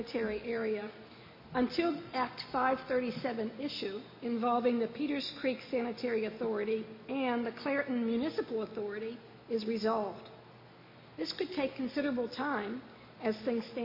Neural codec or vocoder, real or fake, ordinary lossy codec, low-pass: none; real; MP3, 24 kbps; 5.4 kHz